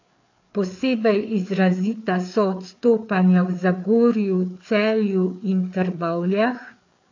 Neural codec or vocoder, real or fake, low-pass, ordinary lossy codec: codec, 16 kHz, 4 kbps, FreqCodec, larger model; fake; 7.2 kHz; AAC, 48 kbps